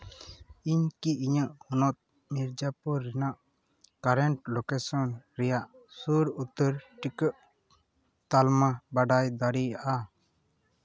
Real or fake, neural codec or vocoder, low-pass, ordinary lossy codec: real; none; none; none